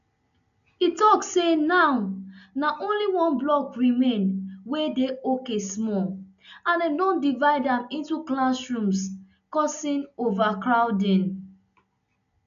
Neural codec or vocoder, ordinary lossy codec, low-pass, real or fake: none; none; 7.2 kHz; real